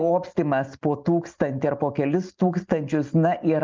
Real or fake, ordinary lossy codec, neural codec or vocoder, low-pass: real; Opus, 24 kbps; none; 7.2 kHz